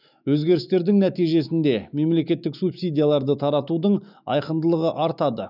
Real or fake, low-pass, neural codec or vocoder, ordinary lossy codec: fake; 5.4 kHz; autoencoder, 48 kHz, 128 numbers a frame, DAC-VAE, trained on Japanese speech; none